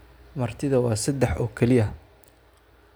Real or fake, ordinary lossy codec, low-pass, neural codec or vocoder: real; none; none; none